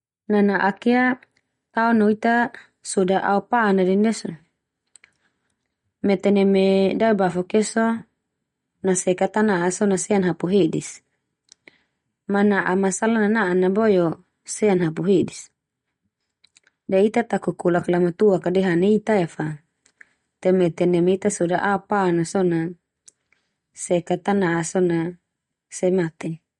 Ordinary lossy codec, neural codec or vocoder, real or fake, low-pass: MP3, 48 kbps; none; real; 10.8 kHz